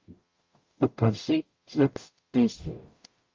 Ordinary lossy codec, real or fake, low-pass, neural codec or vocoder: Opus, 24 kbps; fake; 7.2 kHz; codec, 44.1 kHz, 0.9 kbps, DAC